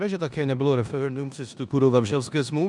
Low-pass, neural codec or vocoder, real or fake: 10.8 kHz; codec, 16 kHz in and 24 kHz out, 0.9 kbps, LongCat-Audio-Codec, four codebook decoder; fake